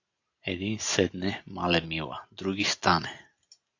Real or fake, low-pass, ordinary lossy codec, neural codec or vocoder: real; 7.2 kHz; AAC, 48 kbps; none